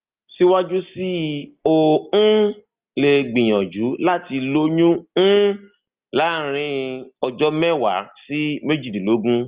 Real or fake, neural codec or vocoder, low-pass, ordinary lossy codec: real; none; 3.6 kHz; Opus, 32 kbps